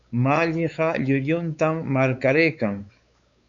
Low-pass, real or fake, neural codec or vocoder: 7.2 kHz; fake; codec, 16 kHz, 4 kbps, X-Codec, WavLM features, trained on Multilingual LibriSpeech